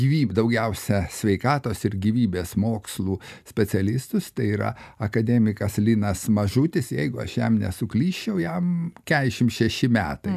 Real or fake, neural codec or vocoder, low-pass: real; none; 14.4 kHz